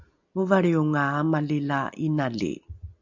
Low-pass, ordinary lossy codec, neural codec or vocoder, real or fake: 7.2 kHz; MP3, 64 kbps; none; real